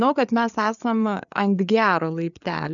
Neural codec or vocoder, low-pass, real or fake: codec, 16 kHz, 4 kbps, FreqCodec, larger model; 7.2 kHz; fake